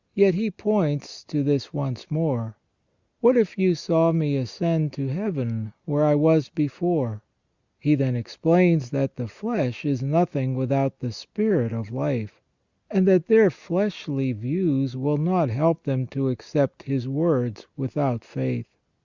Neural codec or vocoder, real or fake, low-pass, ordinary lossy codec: none; real; 7.2 kHz; Opus, 64 kbps